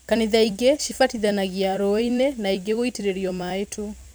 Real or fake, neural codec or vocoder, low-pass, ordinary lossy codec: fake; vocoder, 44.1 kHz, 128 mel bands every 256 samples, BigVGAN v2; none; none